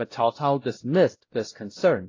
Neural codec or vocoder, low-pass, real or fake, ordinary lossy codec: codec, 16 kHz, 0.5 kbps, X-Codec, WavLM features, trained on Multilingual LibriSpeech; 7.2 kHz; fake; AAC, 32 kbps